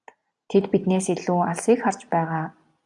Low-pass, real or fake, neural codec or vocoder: 10.8 kHz; real; none